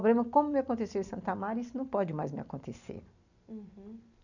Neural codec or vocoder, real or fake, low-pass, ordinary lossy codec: none; real; 7.2 kHz; none